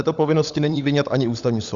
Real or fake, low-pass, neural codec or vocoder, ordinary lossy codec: fake; 7.2 kHz; codec, 16 kHz, 16 kbps, FunCodec, trained on LibriTTS, 50 frames a second; Opus, 64 kbps